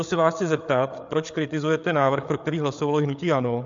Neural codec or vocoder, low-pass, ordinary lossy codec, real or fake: codec, 16 kHz, 8 kbps, FreqCodec, larger model; 7.2 kHz; MP3, 64 kbps; fake